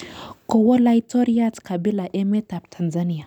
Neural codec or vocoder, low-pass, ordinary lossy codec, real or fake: none; 19.8 kHz; none; real